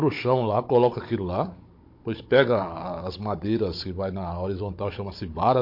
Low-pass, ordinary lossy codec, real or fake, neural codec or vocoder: 5.4 kHz; MP3, 32 kbps; fake; codec, 16 kHz, 16 kbps, FunCodec, trained on Chinese and English, 50 frames a second